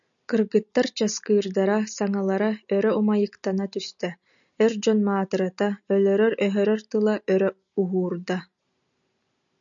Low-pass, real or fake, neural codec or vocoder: 7.2 kHz; real; none